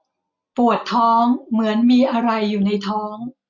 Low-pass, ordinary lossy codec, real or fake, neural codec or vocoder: 7.2 kHz; none; real; none